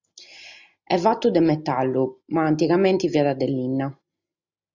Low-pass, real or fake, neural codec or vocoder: 7.2 kHz; real; none